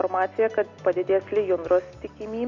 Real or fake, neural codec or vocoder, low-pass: real; none; 7.2 kHz